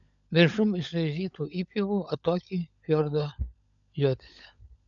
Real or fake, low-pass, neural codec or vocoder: fake; 7.2 kHz; codec, 16 kHz, 16 kbps, FunCodec, trained on LibriTTS, 50 frames a second